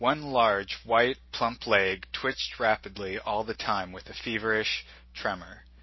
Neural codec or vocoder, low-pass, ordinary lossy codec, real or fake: none; 7.2 kHz; MP3, 24 kbps; real